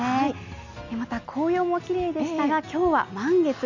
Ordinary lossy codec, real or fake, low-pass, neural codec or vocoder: none; real; 7.2 kHz; none